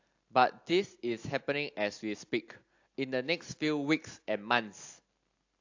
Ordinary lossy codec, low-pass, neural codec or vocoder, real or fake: AAC, 48 kbps; 7.2 kHz; none; real